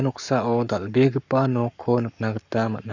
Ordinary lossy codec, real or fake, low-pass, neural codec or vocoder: none; fake; 7.2 kHz; codec, 44.1 kHz, 7.8 kbps, Pupu-Codec